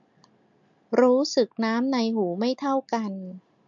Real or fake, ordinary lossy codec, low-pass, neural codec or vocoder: real; MP3, 64 kbps; 7.2 kHz; none